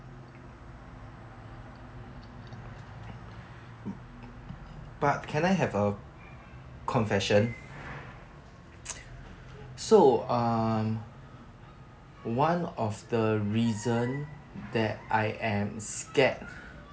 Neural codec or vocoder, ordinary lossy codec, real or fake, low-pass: none; none; real; none